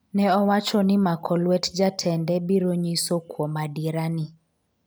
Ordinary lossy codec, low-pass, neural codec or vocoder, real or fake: none; none; none; real